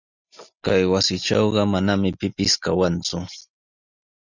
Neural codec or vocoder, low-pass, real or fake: none; 7.2 kHz; real